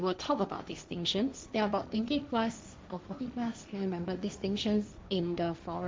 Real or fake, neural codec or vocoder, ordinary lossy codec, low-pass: fake; codec, 16 kHz, 1.1 kbps, Voila-Tokenizer; none; none